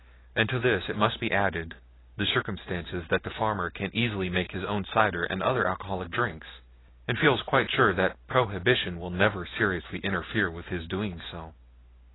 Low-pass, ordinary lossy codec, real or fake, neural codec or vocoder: 7.2 kHz; AAC, 16 kbps; real; none